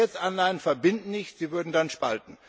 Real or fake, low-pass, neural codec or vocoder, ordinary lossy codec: real; none; none; none